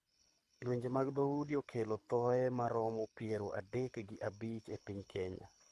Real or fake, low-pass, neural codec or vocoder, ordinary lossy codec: fake; none; codec, 24 kHz, 6 kbps, HILCodec; none